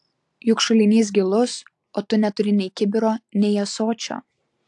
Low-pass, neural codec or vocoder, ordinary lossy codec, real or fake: 10.8 kHz; none; AAC, 64 kbps; real